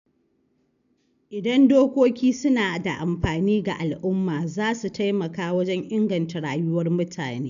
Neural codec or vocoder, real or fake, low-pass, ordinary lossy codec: none; real; 7.2 kHz; none